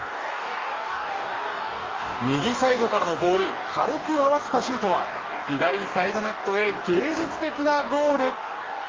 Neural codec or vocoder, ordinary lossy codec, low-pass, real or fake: codec, 44.1 kHz, 2.6 kbps, DAC; Opus, 32 kbps; 7.2 kHz; fake